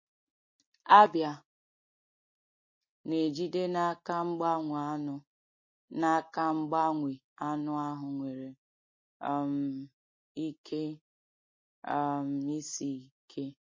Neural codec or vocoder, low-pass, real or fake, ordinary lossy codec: none; 7.2 kHz; real; MP3, 32 kbps